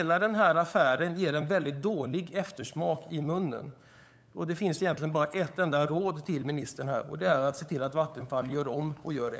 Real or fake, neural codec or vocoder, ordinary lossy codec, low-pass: fake; codec, 16 kHz, 8 kbps, FunCodec, trained on LibriTTS, 25 frames a second; none; none